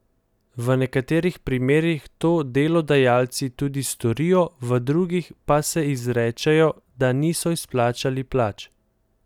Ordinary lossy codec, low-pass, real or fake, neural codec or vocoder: none; 19.8 kHz; real; none